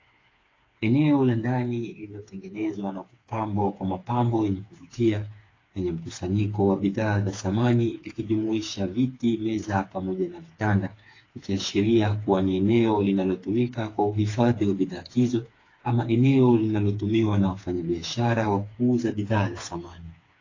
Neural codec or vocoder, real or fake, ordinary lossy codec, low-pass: codec, 16 kHz, 4 kbps, FreqCodec, smaller model; fake; AAC, 32 kbps; 7.2 kHz